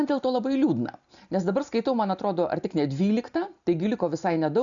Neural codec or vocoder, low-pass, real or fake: none; 7.2 kHz; real